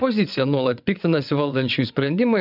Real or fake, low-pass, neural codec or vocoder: fake; 5.4 kHz; vocoder, 22.05 kHz, 80 mel bands, WaveNeXt